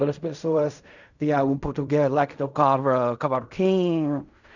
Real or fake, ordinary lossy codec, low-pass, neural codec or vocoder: fake; none; 7.2 kHz; codec, 16 kHz in and 24 kHz out, 0.4 kbps, LongCat-Audio-Codec, fine tuned four codebook decoder